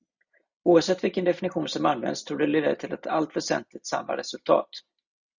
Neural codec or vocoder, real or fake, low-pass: none; real; 7.2 kHz